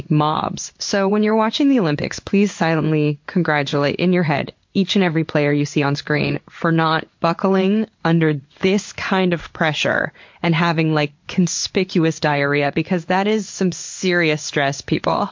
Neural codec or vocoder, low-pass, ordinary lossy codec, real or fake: codec, 16 kHz in and 24 kHz out, 1 kbps, XY-Tokenizer; 7.2 kHz; MP3, 48 kbps; fake